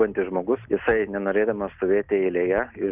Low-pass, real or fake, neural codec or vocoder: 3.6 kHz; real; none